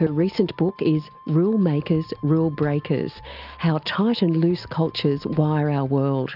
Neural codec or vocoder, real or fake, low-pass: none; real; 5.4 kHz